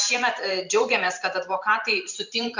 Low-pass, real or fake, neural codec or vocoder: 7.2 kHz; fake; vocoder, 44.1 kHz, 128 mel bands every 512 samples, BigVGAN v2